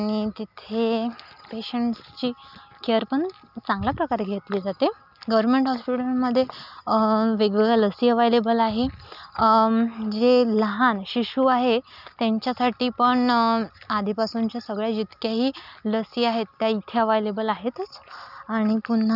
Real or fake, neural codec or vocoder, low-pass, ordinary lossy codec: real; none; 5.4 kHz; none